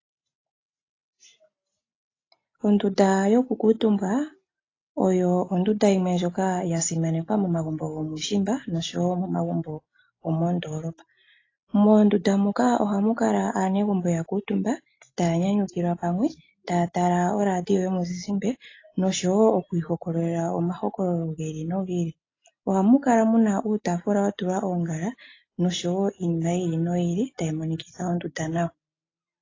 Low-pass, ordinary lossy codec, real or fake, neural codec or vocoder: 7.2 kHz; AAC, 32 kbps; real; none